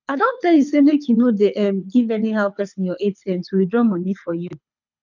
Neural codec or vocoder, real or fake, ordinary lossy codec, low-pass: codec, 24 kHz, 6 kbps, HILCodec; fake; none; 7.2 kHz